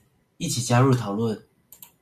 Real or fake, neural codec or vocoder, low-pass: real; none; 14.4 kHz